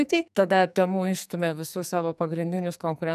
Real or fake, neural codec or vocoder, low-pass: fake; codec, 44.1 kHz, 2.6 kbps, SNAC; 14.4 kHz